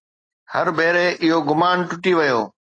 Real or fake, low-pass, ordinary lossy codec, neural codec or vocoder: real; 9.9 kHz; AAC, 32 kbps; none